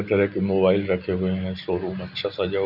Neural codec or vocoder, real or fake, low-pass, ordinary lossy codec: none; real; 5.4 kHz; none